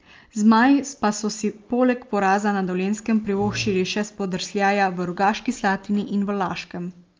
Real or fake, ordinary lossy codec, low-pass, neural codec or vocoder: real; Opus, 24 kbps; 7.2 kHz; none